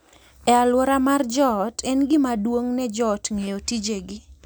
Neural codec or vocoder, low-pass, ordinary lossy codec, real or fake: none; none; none; real